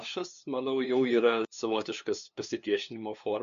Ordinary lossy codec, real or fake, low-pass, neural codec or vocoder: MP3, 64 kbps; fake; 7.2 kHz; codec, 16 kHz, 2 kbps, FunCodec, trained on LibriTTS, 25 frames a second